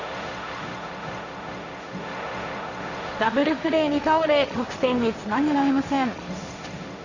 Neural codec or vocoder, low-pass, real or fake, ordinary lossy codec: codec, 16 kHz, 1.1 kbps, Voila-Tokenizer; 7.2 kHz; fake; Opus, 64 kbps